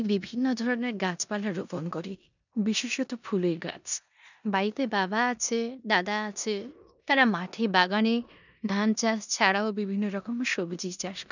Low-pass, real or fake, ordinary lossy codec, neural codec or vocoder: 7.2 kHz; fake; none; codec, 16 kHz in and 24 kHz out, 0.9 kbps, LongCat-Audio-Codec, four codebook decoder